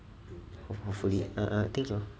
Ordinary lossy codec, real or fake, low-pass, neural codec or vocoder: none; real; none; none